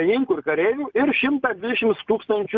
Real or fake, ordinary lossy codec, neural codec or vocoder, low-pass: real; Opus, 24 kbps; none; 7.2 kHz